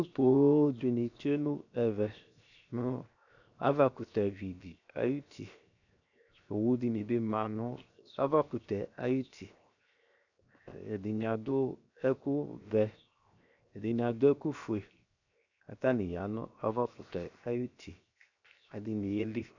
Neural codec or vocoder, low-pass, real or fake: codec, 16 kHz, 0.7 kbps, FocalCodec; 7.2 kHz; fake